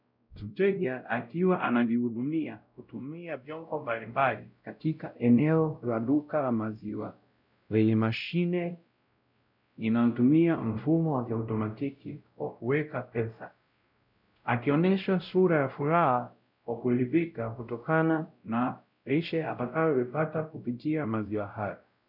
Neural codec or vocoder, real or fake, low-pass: codec, 16 kHz, 0.5 kbps, X-Codec, WavLM features, trained on Multilingual LibriSpeech; fake; 5.4 kHz